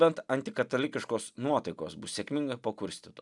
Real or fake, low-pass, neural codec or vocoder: real; 10.8 kHz; none